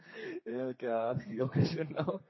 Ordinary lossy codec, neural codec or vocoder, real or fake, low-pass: MP3, 24 kbps; codec, 32 kHz, 1.9 kbps, SNAC; fake; 7.2 kHz